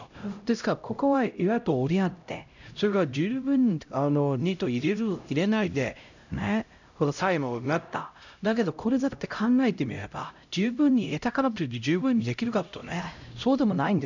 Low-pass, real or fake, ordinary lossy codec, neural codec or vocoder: 7.2 kHz; fake; none; codec, 16 kHz, 0.5 kbps, X-Codec, HuBERT features, trained on LibriSpeech